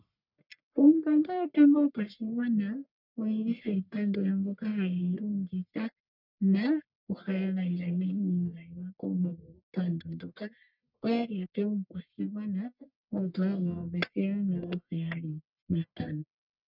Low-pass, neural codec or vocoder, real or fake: 5.4 kHz; codec, 44.1 kHz, 1.7 kbps, Pupu-Codec; fake